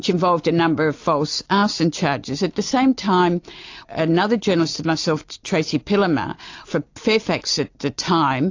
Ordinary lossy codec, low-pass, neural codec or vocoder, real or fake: AAC, 48 kbps; 7.2 kHz; none; real